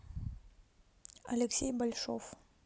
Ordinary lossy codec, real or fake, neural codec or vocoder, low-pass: none; real; none; none